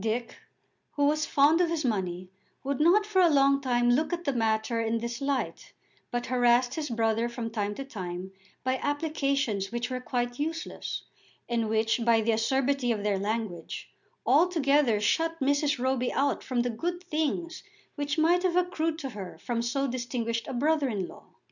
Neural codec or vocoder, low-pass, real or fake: none; 7.2 kHz; real